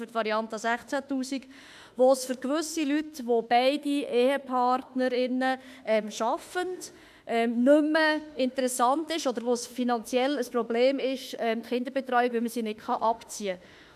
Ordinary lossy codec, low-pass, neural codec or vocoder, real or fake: none; 14.4 kHz; autoencoder, 48 kHz, 32 numbers a frame, DAC-VAE, trained on Japanese speech; fake